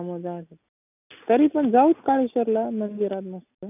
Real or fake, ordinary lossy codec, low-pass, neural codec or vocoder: real; none; 3.6 kHz; none